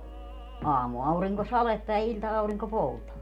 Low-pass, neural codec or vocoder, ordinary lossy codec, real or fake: 19.8 kHz; none; MP3, 96 kbps; real